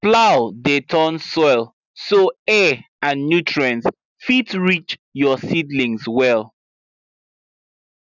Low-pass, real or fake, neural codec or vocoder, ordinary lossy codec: 7.2 kHz; real; none; none